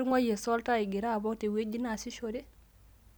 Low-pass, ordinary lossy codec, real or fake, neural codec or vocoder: none; none; real; none